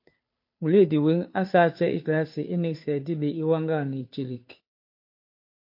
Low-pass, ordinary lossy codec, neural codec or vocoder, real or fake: 5.4 kHz; MP3, 32 kbps; codec, 16 kHz, 2 kbps, FunCodec, trained on Chinese and English, 25 frames a second; fake